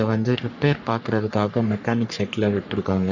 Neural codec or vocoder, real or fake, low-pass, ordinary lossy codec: codec, 44.1 kHz, 3.4 kbps, Pupu-Codec; fake; 7.2 kHz; none